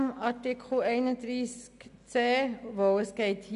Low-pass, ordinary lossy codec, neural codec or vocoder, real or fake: 10.8 kHz; none; none; real